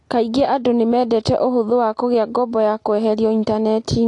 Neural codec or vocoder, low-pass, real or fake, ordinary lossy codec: none; 10.8 kHz; real; AAC, 48 kbps